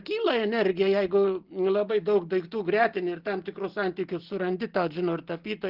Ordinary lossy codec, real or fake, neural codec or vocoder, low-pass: Opus, 16 kbps; real; none; 5.4 kHz